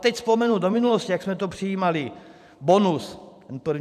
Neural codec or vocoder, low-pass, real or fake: vocoder, 44.1 kHz, 128 mel bands every 512 samples, BigVGAN v2; 14.4 kHz; fake